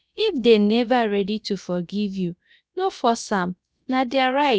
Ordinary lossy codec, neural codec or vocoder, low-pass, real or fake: none; codec, 16 kHz, about 1 kbps, DyCAST, with the encoder's durations; none; fake